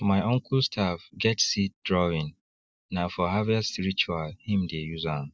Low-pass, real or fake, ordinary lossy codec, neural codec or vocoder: none; real; none; none